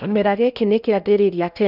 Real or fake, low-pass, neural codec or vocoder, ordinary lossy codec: fake; 5.4 kHz; codec, 16 kHz in and 24 kHz out, 0.8 kbps, FocalCodec, streaming, 65536 codes; none